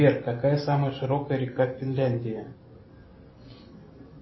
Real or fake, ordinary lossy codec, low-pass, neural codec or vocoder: real; MP3, 24 kbps; 7.2 kHz; none